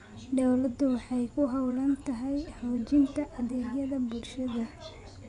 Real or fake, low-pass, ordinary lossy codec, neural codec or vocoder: fake; 10.8 kHz; none; vocoder, 24 kHz, 100 mel bands, Vocos